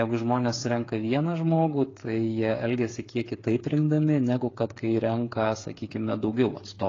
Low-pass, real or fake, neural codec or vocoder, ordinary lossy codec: 7.2 kHz; fake; codec, 16 kHz, 8 kbps, FreqCodec, smaller model; AAC, 32 kbps